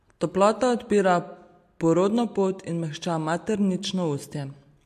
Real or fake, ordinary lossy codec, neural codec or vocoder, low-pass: real; MP3, 64 kbps; none; 14.4 kHz